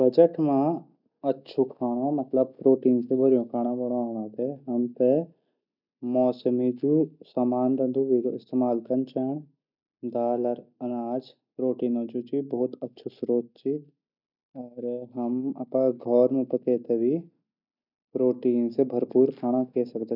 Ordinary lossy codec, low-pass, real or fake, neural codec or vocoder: none; 5.4 kHz; real; none